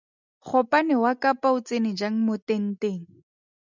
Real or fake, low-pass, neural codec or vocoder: real; 7.2 kHz; none